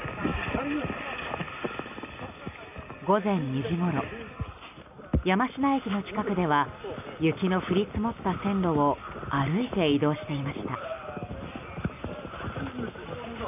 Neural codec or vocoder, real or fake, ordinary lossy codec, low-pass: none; real; none; 3.6 kHz